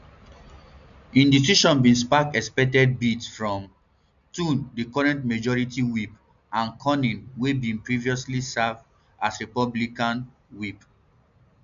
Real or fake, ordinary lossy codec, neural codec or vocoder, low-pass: real; none; none; 7.2 kHz